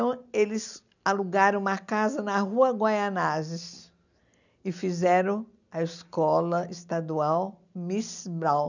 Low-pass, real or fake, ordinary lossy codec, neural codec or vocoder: 7.2 kHz; real; none; none